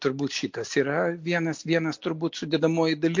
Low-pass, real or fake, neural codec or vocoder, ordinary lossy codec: 7.2 kHz; real; none; MP3, 48 kbps